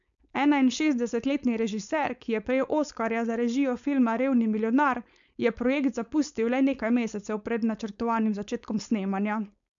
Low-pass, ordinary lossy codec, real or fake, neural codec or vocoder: 7.2 kHz; none; fake; codec, 16 kHz, 4.8 kbps, FACodec